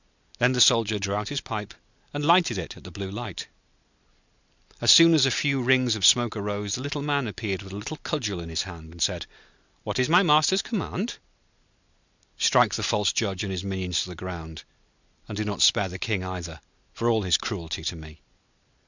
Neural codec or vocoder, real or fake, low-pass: none; real; 7.2 kHz